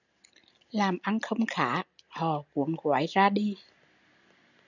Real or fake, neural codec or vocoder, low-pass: real; none; 7.2 kHz